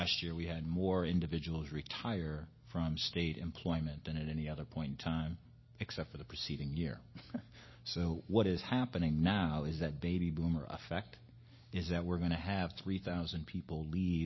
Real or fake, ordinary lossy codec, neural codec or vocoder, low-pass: real; MP3, 24 kbps; none; 7.2 kHz